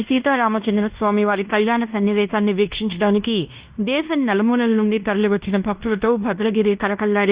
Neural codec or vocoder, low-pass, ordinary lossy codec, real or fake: codec, 16 kHz in and 24 kHz out, 0.9 kbps, LongCat-Audio-Codec, fine tuned four codebook decoder; 3.6 kHz; Opus, 64 kbps; fake